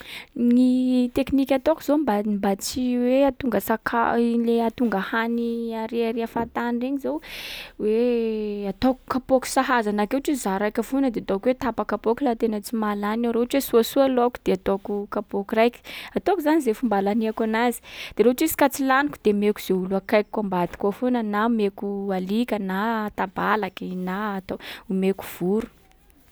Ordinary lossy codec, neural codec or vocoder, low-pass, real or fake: none; none; none; real